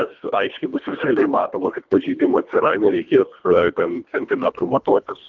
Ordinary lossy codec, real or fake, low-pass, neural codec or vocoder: Opus, 32 kbps; fake; 7.2 kHz; codec, 24 kHz, 1.5 kbps, HILCodec